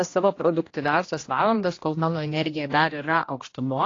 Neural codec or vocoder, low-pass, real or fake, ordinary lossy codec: codec, 16 kHz, 1 kbps, X-Codec, HuBERT features, trained on general audio; 7.2 kHz; fake; AAC, 32 kbps